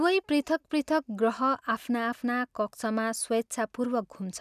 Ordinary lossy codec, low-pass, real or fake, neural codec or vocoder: none; 14.4 kHz; real; none